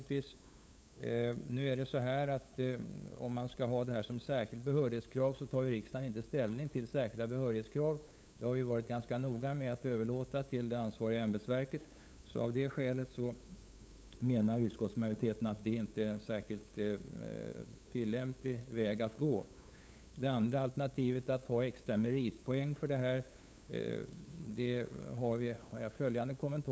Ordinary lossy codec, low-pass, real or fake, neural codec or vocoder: none; none; fake; codec, 16 kHz, 8 kbps, FunCodec, trained on LibriTTS, 25 frames a second